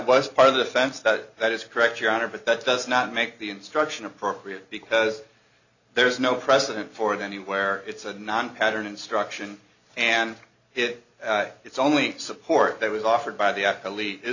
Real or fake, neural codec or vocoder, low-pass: real; none; 7.2 kHz